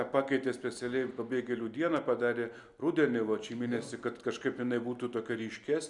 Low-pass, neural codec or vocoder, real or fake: 10.8 kHz; none; real